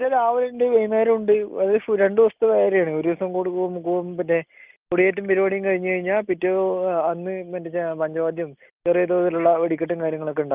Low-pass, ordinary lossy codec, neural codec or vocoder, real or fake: 3.6 kHz; Opus, 32 kbps; none; real